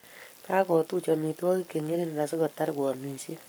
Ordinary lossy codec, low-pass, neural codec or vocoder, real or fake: none; none; codec, 44.1 kHz, 7.8 kbps, Pupu-Codec; fake